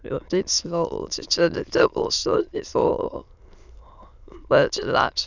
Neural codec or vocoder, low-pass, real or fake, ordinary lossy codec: autoencoder, 22.05 kHz, a latent of 192 numbers a frame, VITS, trained on many speakers; 7.2 kHz; fake; none